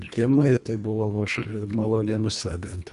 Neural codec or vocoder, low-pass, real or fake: codec, 24 kHz, 1.5 kbps, HILCodec; 10.8 kHz; fake